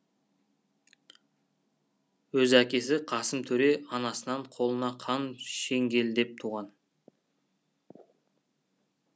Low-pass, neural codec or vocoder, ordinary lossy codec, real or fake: none; none; none; real